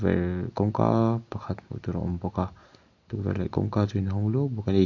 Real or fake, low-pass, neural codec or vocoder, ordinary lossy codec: real; 7.2 kHz; none; AAC, 48 kbps